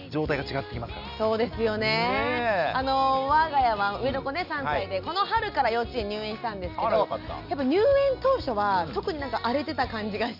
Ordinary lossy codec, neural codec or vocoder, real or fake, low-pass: none; none; real; 5.4 kHz